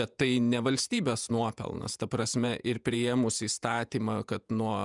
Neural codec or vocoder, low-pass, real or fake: vocoder, 48 kHz, 128 mel bands, Vocos; 10.8 kHz; fake